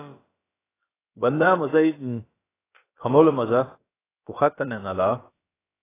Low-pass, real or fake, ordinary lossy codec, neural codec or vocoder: 3.6 kHz; fake; AAC, 16 kbps; codec, 16 kHz, about 1 kbps, DyCAST, with the encoder's durations